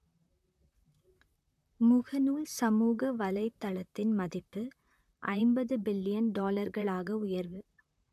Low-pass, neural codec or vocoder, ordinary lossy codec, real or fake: 14.4 kHz; vocoder, 44.1 kHz, 128 mel bands, Pupu-Vocoder; MP3, 96 kbps; fake